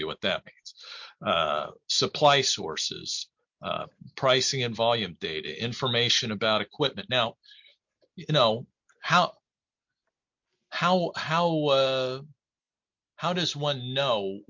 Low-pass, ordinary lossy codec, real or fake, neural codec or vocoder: 7.2 kHz; MP3, 48 kbps; real; none